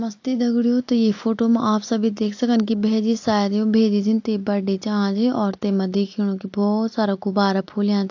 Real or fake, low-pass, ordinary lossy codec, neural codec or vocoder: real; 7.2 kHz; AAC, 48 kbps; none